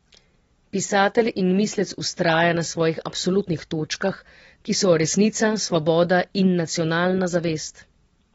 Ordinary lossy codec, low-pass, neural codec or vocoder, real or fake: AAC, 24 kbps; 10.8 kHz; none; real